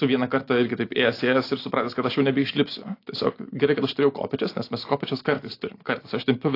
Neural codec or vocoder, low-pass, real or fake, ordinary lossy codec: none; 5.4 kHz; real; AAC, 32 kbps